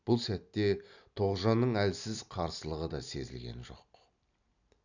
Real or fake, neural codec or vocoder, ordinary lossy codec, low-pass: real; none; none; 7.2 kHz